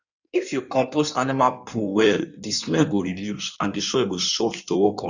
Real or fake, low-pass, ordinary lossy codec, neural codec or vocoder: fake; 7.2 kHz; none; codec, 16 kHz in and 24 kHz out, 1.1 kbps, FireRedTTS-2 codec